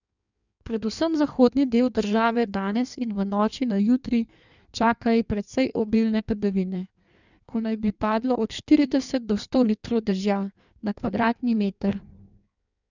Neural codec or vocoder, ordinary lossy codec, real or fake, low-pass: codec, 16 kHz in and 24 kHz out, 1.1 kbps, FireRedTTS-2 codec; none; fake; 7.2 kHz